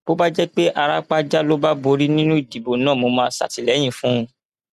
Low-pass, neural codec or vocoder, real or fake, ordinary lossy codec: 14.4 kHz; none; real; none